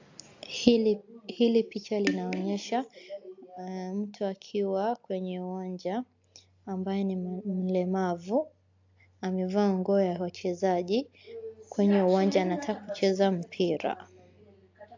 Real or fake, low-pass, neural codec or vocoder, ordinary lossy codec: real; 7.2 kHz; none; AAC, 48 kbps